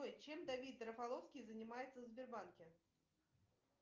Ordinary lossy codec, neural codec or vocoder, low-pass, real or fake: Opus, 24 kbps; none; 7.2 kHz; real